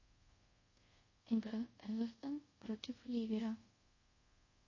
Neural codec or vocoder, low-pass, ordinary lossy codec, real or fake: codec, 24 kHz, 0.5 kbps, DualCodec; 7.2 kHz; MP3, 32 kbps; fake